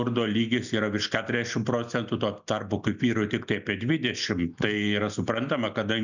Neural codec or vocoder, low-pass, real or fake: none; 7.2 kHz; real